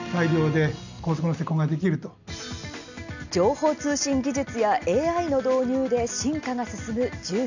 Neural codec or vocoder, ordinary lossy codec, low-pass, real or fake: none; none; 7.2 kHz; real